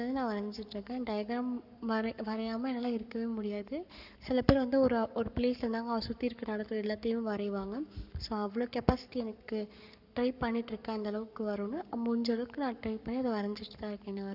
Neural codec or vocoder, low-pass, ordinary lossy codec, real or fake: codec, 44.1 kHz, 7.8 kbps, Pupu-Codec; 5.4 kHz; none; fake